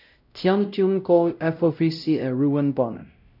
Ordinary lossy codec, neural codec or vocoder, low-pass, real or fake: none; codec, 16 kHz, 0.5 kbps, X-Codec, WavLM features, trained on Multilingual LibriSpeech; 5.4 kHz; fake